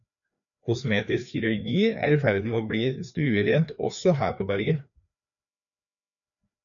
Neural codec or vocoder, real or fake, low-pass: codec, 16 kHz, 2 kbps, FreqCodec, larger model; fake; 7.2 kHz